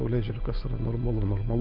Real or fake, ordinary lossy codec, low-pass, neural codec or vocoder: real; Opus, 24 kbps; 5.4 kHz; none